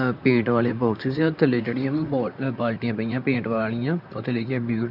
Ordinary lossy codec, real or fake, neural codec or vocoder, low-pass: none; fake; vocoder, 44.1 kHz, 128 mel bands, Pupu-Vocoder; 5.4 kHz